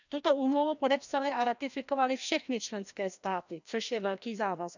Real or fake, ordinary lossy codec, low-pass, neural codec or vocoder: fake; none; 7.2 kHz; codec, 16 kHz, 1 kbps, FreqCodec, larger model